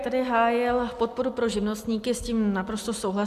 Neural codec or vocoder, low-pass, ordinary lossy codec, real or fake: none; 14.4 kHz; MP3, 96 kbps; real